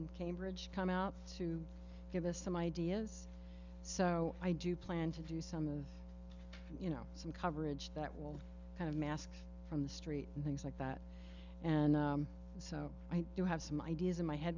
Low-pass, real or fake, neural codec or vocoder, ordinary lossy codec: 7.2 kHz; real; none; Opus, 64 kbps